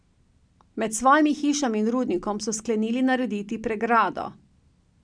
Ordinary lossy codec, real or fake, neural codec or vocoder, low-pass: none; real; none; 9.9 kHz